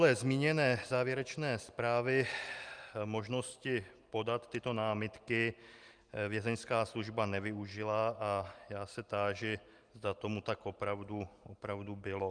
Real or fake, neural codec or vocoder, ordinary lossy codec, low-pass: real; none; Opus, 32 kbps; 9.9 kHz